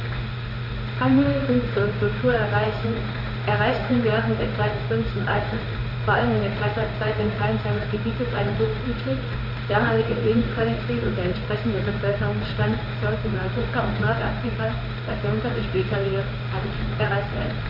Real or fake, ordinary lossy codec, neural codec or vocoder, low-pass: fake; none; codec, 16 kHz in and 24 kHz out, 1 kbps, XY-Tokenizer; 5.4 kHz